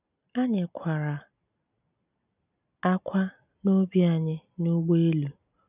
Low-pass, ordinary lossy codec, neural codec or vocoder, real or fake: 3.6 kHz; none; none; real